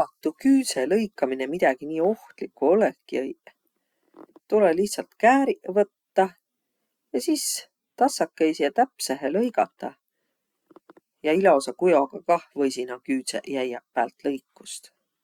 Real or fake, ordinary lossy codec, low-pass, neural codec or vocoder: real; Opus, 64 kbps; 19.8 kHz; none